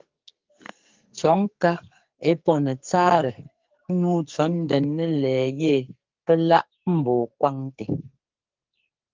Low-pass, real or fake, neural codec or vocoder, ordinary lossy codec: 7.2 kHz; fake; codec, 44.1 kHz, 2.6 kbps, SNAC; Opus, 32 kbps